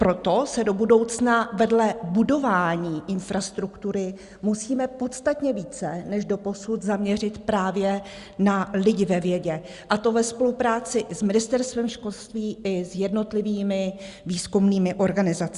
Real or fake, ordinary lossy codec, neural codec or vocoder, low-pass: real; Opus, 64 kbps; none; 10.8 kHz